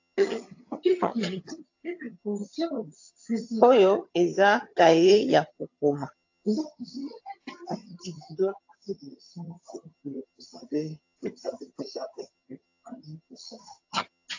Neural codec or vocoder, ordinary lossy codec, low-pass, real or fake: vocoder, 22.05 kHz, 80 mel bands, HiFi-GAN; AAC, 48 kbps; 7.2 kHz; fake